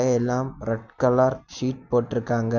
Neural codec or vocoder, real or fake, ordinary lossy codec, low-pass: none; real; none; 7.2 kHz